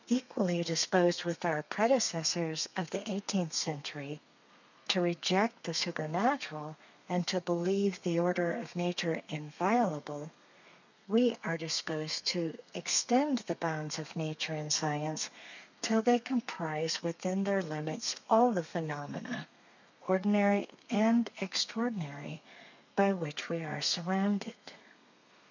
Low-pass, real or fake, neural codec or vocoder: 7.2 kHz; fake; codec, 32 kHz, 1.9 kbps, SNAC